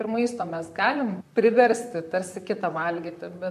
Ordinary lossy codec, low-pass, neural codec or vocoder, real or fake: MP3, 64 kbps; 14.4 kHz; vocoder, 44.1 kHz, 128 mel bands, Pupu-Vocoder; fake